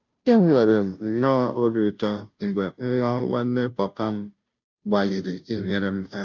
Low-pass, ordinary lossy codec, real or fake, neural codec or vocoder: 7.2 kHz; none; fake; codec, 16 kHz, 0.5 kbps, FunCodec, trained on Chinese and English, 25 frames a second